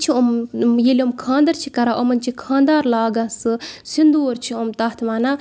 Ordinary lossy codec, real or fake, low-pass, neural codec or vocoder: none; real; none; none